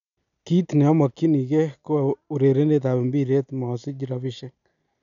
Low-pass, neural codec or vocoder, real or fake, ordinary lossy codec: 7.2 kHz; none; real; none